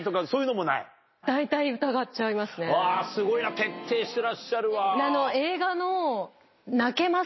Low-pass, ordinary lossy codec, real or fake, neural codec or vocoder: 7.2 kHz; MP3, 24 kbps; real; none